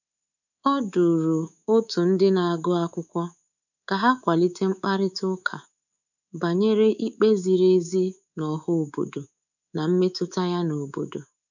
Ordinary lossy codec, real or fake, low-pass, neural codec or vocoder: none; fake; 7.2 kHz; codec, 24 kHz, 3.1 kbps, DualCodec